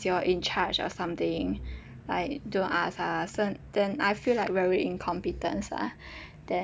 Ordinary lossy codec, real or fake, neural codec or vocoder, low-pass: none; real; none; none